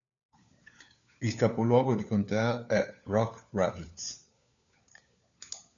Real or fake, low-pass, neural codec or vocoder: fake; 7.2 kHz; codec, 16 kHz, 4 kbps, FunCodec, trained on LibriTTS, 50 frames a second